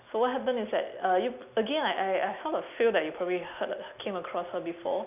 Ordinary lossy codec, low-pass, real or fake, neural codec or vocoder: none; 3.6 kHz; real; none